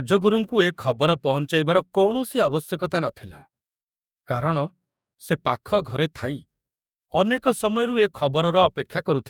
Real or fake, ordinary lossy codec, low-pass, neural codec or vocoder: fake; none; 19.8 kHz; codec, 44.1 kHz, 2.6 kbps, DAC